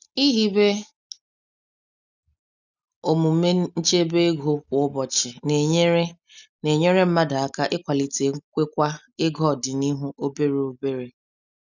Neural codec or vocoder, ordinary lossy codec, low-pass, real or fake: none; none; 7.2 kHz; real